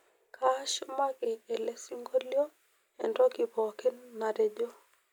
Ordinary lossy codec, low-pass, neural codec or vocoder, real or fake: none; none; none; real